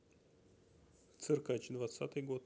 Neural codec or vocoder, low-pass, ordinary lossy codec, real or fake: none; none; none; real